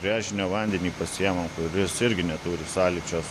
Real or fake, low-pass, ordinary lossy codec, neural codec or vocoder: real; 14.4 kHz; AAC, 64 kbps; none